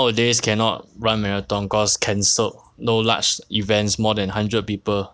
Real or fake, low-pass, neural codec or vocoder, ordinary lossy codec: real; none; none; none